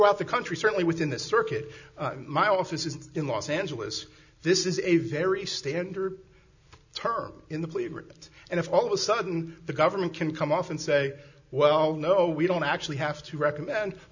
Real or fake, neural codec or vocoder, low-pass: real; none; 7.2 kHz